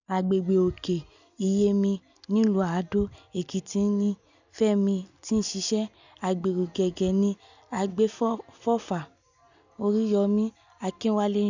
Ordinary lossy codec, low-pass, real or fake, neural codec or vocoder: none; 7.2 kHz; real; none